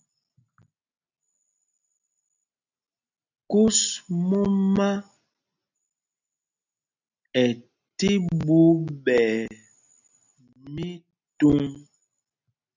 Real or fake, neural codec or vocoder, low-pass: real; none; 7.2 kHz